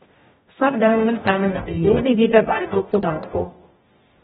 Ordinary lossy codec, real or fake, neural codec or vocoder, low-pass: AAC, 16 kbps; fake; codec, 44.1 kHz, 0.9 kbps, DAC; 19.8 kHz